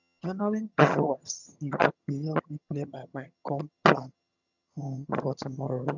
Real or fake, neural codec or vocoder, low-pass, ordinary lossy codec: fake; vocoder, 22.05 kHz, 80 mel bands, HiFi-GAN; 7.2 kHz; none